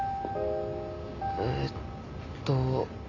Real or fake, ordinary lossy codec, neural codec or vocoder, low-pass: real; none; none; 7.2 kHz